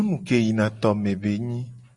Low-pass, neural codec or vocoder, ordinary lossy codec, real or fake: 10.8 kHz; none; Opus, 64 kbps; real